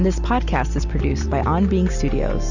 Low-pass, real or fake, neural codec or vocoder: 7.2 kHz; real; none